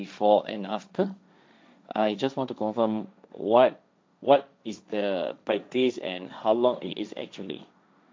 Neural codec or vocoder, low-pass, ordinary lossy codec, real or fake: codec, 16 kHz, 1.1 kbps, Voila-Tokenizer; none; none; fake